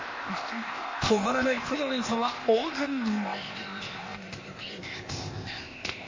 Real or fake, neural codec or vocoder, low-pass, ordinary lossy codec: fake; codec, 16 kHz, 0.8 kbps, ZipCodec; 7.2 kHz; MP3, 32 kbps